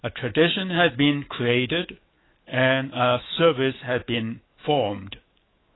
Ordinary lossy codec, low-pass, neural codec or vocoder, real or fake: AAC, 16 kbps; 7.2 kHz; autoencoder, 48 kHz, 128 numbers a frame, DAC-VAE, trained on Japanese speech; fake